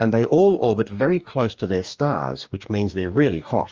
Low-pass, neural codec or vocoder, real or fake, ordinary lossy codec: 7.2 kHz; codec, 44.1 kHz, 2.6 kbps, DAC; fake; Opus, 24 kbps